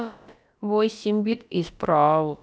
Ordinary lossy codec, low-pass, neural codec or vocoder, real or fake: none; none; codec, 16 kHz, about 1 kbps, DyCAST, with the encoder's durations; fake